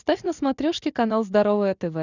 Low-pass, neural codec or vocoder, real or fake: 7.2 kHz; none; real